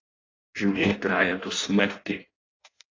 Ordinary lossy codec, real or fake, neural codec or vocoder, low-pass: AAC, 32 kbps; fake; codec, 16 kHz in and 24 kHz out, 0.6 kbps, FireRedTTS-2 codec; 7.2 kHz